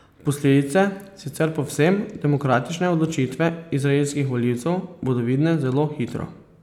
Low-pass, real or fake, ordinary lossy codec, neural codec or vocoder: 19.8 kHz; real; none; none